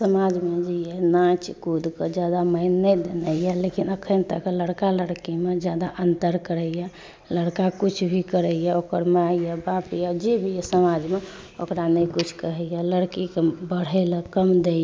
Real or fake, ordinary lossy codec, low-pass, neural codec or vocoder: real; none; none; none